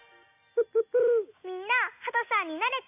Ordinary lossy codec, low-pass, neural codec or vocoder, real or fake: AAC, 32 kbps; 3.6 kHz; none; real